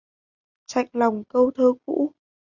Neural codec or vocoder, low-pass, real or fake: none; 7.2 kHz; real